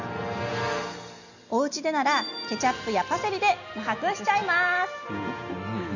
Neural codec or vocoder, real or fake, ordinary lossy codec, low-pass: none; real; none; 7.2 kHz